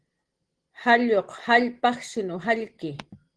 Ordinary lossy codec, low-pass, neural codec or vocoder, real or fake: Opus, 16 kbps; 9.9 kHz; none; real